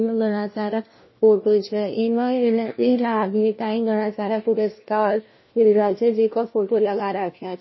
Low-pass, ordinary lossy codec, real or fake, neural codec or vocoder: 7.2 kHz; MP3, 24 kbps; fake; codec, 16 kHz, 1 kbps, FunCodec, trained on LibriTTS, 50 frames a second